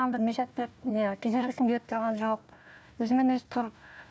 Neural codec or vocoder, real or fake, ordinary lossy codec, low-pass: codec, 16 kHz, 1 kbps, FunCodec, trained on Chinese and English, 50 frames a second; fake; none; none